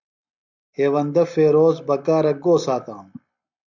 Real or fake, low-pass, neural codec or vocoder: real; 7.2 kHz; none